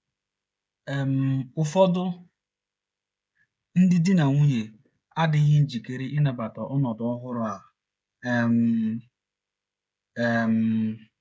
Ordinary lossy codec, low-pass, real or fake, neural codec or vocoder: none; none; fake; codec, 16 kHz, 16 kbps, FreqCodec, smaller model